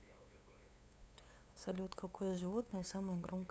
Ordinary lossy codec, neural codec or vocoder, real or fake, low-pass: none; codec, 16 kHz, 8 kbps, FunCodec, trained on LibriTTS, 25 frames a second; fake; none